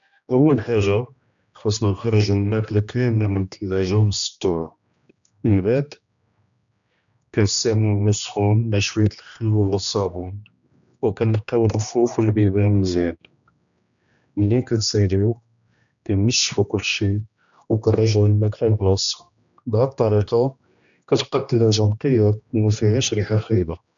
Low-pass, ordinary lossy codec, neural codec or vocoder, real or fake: 7.2 kHz; none; codec, 16 kHz, 1 kbps, X-Codec, HuBERT features, trained on general audio; fake